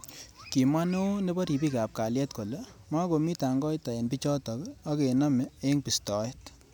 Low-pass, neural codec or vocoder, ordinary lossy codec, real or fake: none; none; none; real